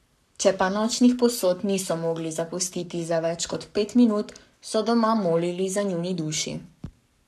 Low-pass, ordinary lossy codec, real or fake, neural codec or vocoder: 14.4 kHz; none; fake; codec, 44.1 kHz, 7.8 kbps, Pupu-Codec